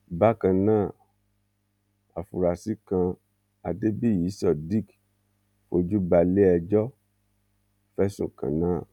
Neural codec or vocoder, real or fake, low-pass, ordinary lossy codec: none; real; 19.8 kHz; none